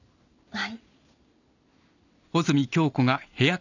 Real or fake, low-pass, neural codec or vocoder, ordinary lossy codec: real; 7.2 kHz; none; none